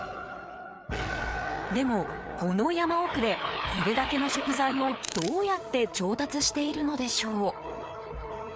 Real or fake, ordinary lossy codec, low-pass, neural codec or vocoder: fake; none; none; codec, 16 kHz, 4 kbps, FreqCodec, larger model